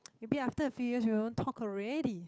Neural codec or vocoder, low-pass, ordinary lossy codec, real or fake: codec, 16 kHz, 8 kbps, FunCodec, trained on Chinese and English, 25 frames a second; none; none; fake